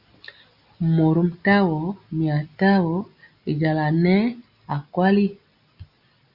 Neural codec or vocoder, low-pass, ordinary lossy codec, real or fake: none; 5.4 kHz; Opus, 64 kbps; real